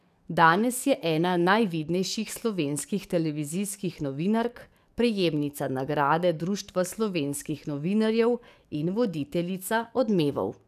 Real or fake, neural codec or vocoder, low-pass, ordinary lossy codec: fake; codec, 44.1 kHz, 7.8 kbps, DAC; 14.4 kHz; none